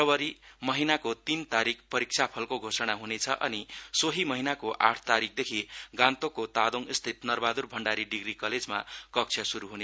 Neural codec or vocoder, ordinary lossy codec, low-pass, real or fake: none; none; none; real